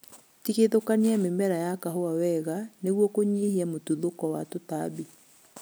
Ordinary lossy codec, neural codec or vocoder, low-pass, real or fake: none; none; none; real